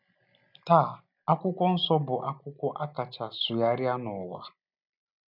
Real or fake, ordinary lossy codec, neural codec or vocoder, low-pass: real; none; none; 5.4 kHz